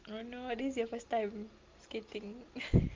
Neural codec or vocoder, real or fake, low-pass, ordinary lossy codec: none; real; 7.2 kHz; Opus, 32 kbps